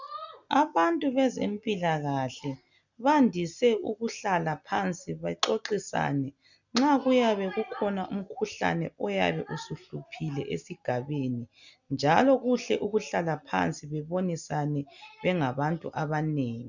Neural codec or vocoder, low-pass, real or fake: none; 7.2 kHz; real